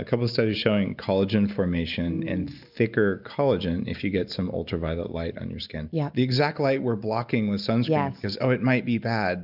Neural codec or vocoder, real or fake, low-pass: none; real; 5.4 kHz